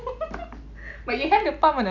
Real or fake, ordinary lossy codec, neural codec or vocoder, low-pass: real; none; none; 7.2 kHz